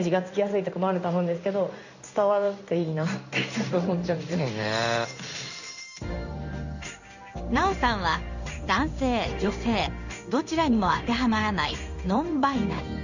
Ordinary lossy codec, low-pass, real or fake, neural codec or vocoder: none; 7.2 kHz; fake; codec, 16 kHz in and 24 kHz out, 1 kbps, XY-Tokenizer